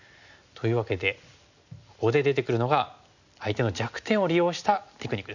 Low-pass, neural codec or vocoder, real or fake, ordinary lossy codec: 7.2 kHz; none; real; none